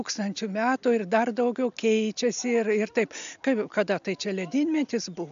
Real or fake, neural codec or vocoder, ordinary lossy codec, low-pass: real; none; MP3, 64 kbps; 7.2 kHz